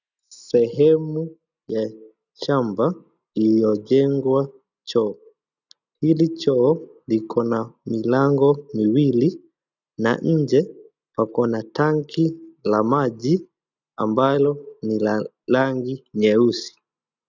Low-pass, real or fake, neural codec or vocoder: 7.2 kHz; real; none